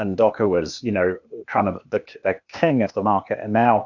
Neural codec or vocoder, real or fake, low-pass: codec, 16 kHz, 0.8 kbps, ZipCodec; fake; 7.2 kHz